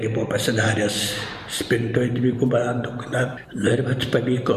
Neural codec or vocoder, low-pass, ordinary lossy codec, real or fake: vocoder, 44.1 kHz, 128 mel bands every 512 samples, BigVGAN v2; 14.4 kHz; MP3, 64 kbps; fake